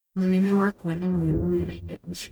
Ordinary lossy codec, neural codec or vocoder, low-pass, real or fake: none; codec, 44.1 kHz, 0.9 kbps, DAC; none; fake